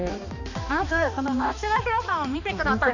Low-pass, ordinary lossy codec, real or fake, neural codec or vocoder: 7.2 kHz; none; fake; codec, 16 kHz, 2 kbps, X-Codec, HuBERT features, trained on balanced general audio